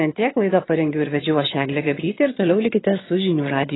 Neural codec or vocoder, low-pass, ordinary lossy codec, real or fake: vocoder, 22.05 kHz, 80 mel bands, HiFi-GAN; 7.2 kHz; AAC, 16 kbps; fake